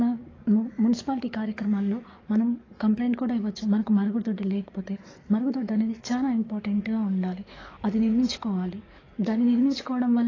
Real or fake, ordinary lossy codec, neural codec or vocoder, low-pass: fake; AAC, 32 kbps; codec, 44.1 kHz, 7.8 kbps, DAC; 7.2 kHz